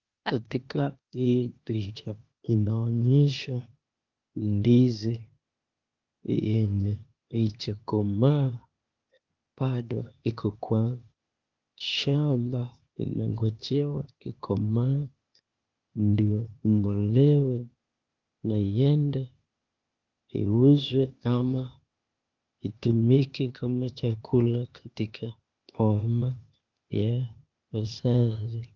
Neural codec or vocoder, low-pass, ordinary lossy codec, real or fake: codec, 16 kHz, 0.8 kbps, ZipCodec; 7.2 kHz; Opus, 32 kbps; fake